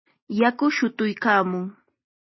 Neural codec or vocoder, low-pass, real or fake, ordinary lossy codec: none; 7.2 kHz; real; MP3, 24 kbps